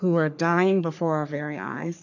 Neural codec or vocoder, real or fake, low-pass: codec, 44.1 kHz, 3.4 kbps, Pupu-Codec; fake; 7.2 kHz